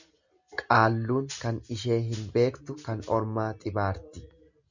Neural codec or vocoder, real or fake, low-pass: none; real; 7.2 kHz